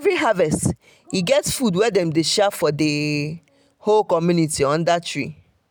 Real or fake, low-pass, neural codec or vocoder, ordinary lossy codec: real; none; none; none